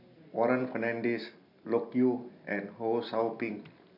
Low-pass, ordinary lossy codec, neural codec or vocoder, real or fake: 5.4 kHz; MP3, 48 kbps; none; real